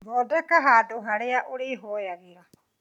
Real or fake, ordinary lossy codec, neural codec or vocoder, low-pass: real; none; none; 19.8 kHz